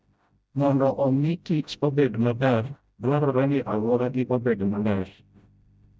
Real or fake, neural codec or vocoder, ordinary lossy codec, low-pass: fake; codec, 16 kHz, 0.5 kbps, FreqCodec, smaller model; none; none